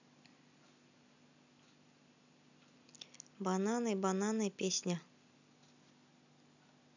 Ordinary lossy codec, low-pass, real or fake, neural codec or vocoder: none; 7.2 kHz; real; none